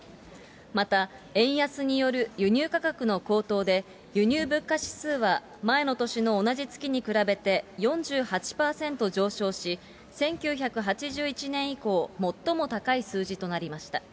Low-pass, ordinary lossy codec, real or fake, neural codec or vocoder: none; none; real; none